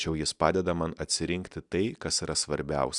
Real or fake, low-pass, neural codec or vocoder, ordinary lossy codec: real; 10.8 kHz; none; Opus, 64 kbps